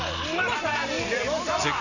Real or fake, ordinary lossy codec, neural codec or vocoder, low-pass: real; none; none; 7.2 kHz